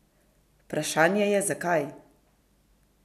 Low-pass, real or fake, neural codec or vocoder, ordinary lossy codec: 14.4 kHz; real; none; none